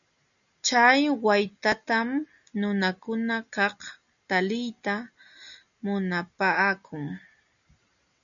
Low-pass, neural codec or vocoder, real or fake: 7.2 kHz; none; real